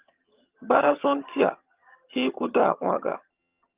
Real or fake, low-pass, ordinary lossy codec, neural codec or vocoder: fake; 3.6 kHz; Opus, 24 kbps; vocoder, 22.05 kHz, 80 mel bands, HiFi-GAN